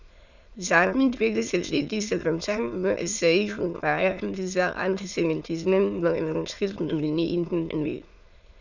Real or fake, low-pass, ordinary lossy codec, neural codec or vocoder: fake; 7.2 kHz; none; autoencoder, 22.05 kHz, a latent of 192 numbers a frame, VITS, trained on many speakers